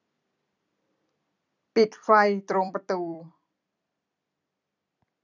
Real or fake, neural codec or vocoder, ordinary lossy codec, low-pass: fake; vocoder, 44.1 kHz, 128 mel bands every 256 samples, BigVGAN v2; none; 7.2 kHz